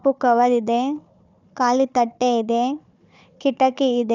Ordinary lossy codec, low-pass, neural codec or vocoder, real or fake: none; 7.2 kHz; codec, 16 kHz, 4 kbps, FunCodec, trained on Chinese and English, 50 frames a second; fake